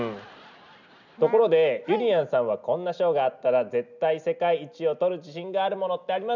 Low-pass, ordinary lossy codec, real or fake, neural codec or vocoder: 7.2 kHz; none; real; none